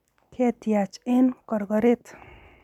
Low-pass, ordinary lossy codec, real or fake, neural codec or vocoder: 19.8 kHz; none; real; none